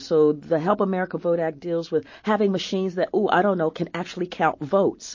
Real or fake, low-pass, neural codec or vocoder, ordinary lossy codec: real; 7.2 kHz; none; MP3, 32 kbps